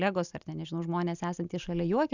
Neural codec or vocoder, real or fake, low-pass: none; real; 7.2 kHz